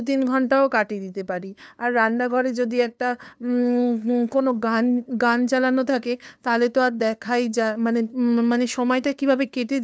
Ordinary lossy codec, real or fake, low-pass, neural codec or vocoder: none; fake; none; codec, 16 kHz, 2 kbps, FunCodec, trained on LibriTTS, 25 frames a second